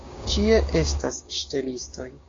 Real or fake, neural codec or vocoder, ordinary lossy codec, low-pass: fake; codec, 16 kHz, 6 kbps, DAC; AAC, 32 kbps; 7.2 kHz